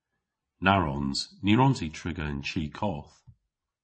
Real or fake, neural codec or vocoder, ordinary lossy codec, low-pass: fake; vocoder, 24 kHz, 100 mel bands, Vocos; MP3, 32 kbps; 9.9 kHz